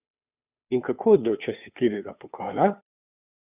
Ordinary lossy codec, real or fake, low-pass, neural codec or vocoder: none; fake; 3.6 kHz; codec, 16 kHz, 2 kbps, FunCodec, trained on Chinese and English, 25 frames a second